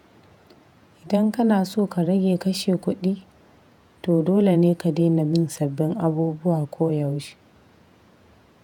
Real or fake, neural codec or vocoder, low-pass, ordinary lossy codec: fake; vocoder, 48 kHz, 128 mel bands, Vocos; 19.8 kHz; none